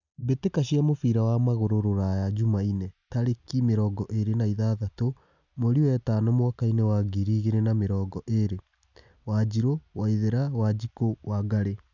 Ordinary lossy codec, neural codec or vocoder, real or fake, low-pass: none; none; real; 7.2 kHz